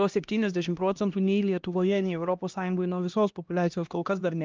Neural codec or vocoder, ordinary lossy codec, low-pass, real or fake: codec, 16 kHz, 1 kbps, X-Codec, HuBERT features, trained on LibriSpeech; Opus, 32 kbps; 7.2 kHz; fake